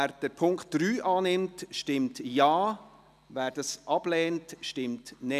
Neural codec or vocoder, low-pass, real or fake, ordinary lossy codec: none; 14.4 kHz; real; none